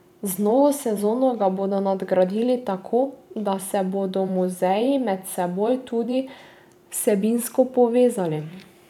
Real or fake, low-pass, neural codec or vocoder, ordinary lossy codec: fake; 19.8 kHz; vocoder, 44.1 kHz, 128 mel bands every 512 samples, BigVGAN v2; none